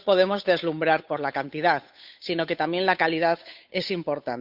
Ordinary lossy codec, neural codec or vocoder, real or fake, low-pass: none; codec, 16 kHz, 8 kbps, FunCodec, trained on Chinese and English, 25 frames a second; fake; 5.4 kHz